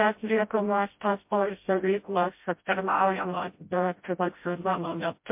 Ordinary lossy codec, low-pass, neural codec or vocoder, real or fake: MP3, 32 kbps; 3.6 kHz; codec, 16 kHz, 0.5 kbps, FreqCodec, smaller model; fake